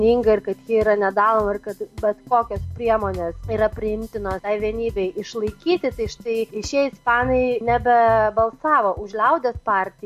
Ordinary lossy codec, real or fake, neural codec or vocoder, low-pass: MP3, 64 kbps; real; none; 14.4 kHz